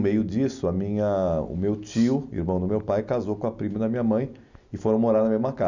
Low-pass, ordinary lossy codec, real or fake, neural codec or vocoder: 7.2 kHz; none; real; none